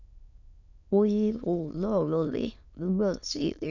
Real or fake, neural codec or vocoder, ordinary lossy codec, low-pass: fake; autoencoder, 22.05 kHz, a latent of 192 numbers a frame, VITS, trained on many speakers; none; 7.2 kHz